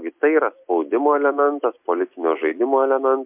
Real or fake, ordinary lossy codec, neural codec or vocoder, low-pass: real; MP3, 32 kbps; none; 3.6 kHz